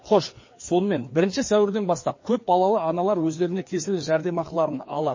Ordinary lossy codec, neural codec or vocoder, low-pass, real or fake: MP3, 32 kbps; codec, 16 kHz, 2 kbps, FreqCodec, larger model; 7.2 kHz; fake